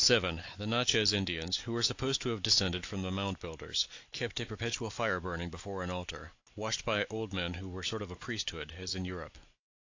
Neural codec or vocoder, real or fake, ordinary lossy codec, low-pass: none; real; AAC, 48 kbps; 7.2 kHz